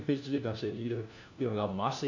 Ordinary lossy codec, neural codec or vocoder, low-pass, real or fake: none; codec, 16 kHz, 1 kbps, FunCodec, trained on LibriTTS, 50 frames a second; 7.2 kHz; fake